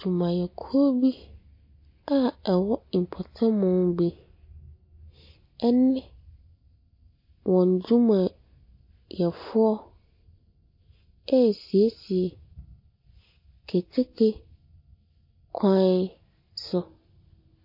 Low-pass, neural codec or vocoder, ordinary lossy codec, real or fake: 5.4 kHz; none; MP3, 32 kbps; real